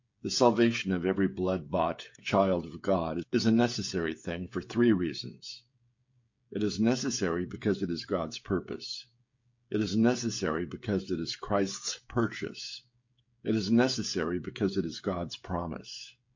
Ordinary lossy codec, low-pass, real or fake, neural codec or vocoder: MP3, 48 kbps; 7.2 kHz; fake; codec, 16 kHz, 16 kbps, FreqCodec, smaller model